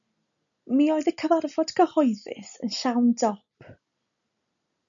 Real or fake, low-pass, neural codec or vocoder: real; 7.2 kHz; none